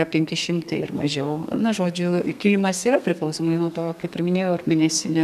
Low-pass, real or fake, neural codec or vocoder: 14.4 kHz; fake; codec, 32 kHz, 1.9 kbps, SNAC